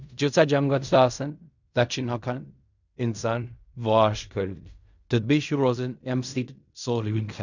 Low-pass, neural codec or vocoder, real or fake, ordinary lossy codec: 7.2 kHz; codec, 16 kHz in and 24 kHz out, 0.4 kbps, LongCat-Audio-Codec, fine tuned four codebook decoder; fake; none